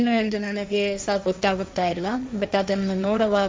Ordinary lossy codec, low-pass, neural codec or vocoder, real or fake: none; none; codec, 16 kHz, 1.1 kbps, Voila-Tokenizer; fake